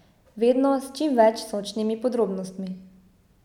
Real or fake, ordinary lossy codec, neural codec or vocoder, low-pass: real; none; none; 19.8 kHz